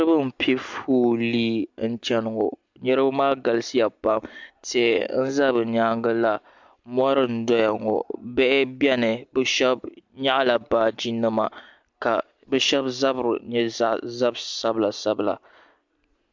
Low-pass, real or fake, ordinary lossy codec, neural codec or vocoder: 7.2 kHz; real; MP3, 64 kbps; none